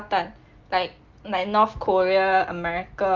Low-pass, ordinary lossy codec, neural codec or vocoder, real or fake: 7.2 kHz; Opus, 32 kbps; none; real